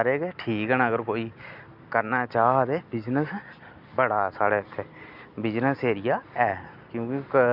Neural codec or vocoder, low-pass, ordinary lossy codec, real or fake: none; 5.4 kHz; none; real